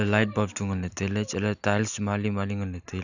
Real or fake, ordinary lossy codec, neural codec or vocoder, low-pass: real; none; none; 7.2 kHz